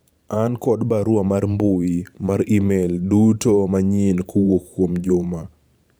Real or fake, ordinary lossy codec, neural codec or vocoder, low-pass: fake; none; vocoder, 44.1 kHz, 128 mel bands every 512 samples, BigVGAN v2; none